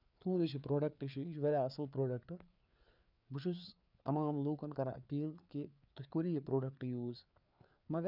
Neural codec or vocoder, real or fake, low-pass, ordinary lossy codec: codec, 16 kHz, 4 kbps, FreqCodec, larger model; fake; 5.4 kHz; none